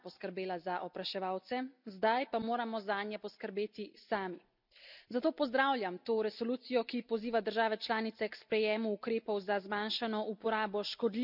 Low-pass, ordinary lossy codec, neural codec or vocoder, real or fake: 5.4 kHz; none; none; real